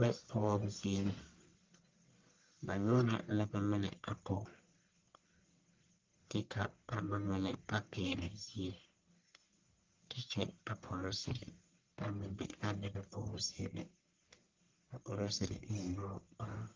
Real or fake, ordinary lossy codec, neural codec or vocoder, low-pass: fake; Opus, 32 kbps; codec, 44.1 kHz, 1.7 kbps, Pupu-Codec; 7.2 kHz